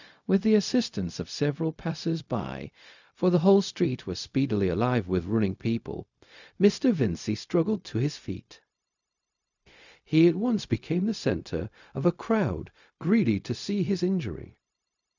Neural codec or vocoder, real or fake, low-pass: codec, 16 kHz, 0.4 kbps, LongCat-Audio-Codec; fake; 7.2 kHz